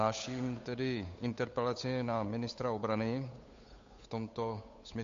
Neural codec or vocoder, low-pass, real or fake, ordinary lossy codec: codec, 16 kHz, 8 kbps, FunCodec, trained on Chinese and English, 25 frames a second; 7.2 kHz; fake; MP3, 48 kbps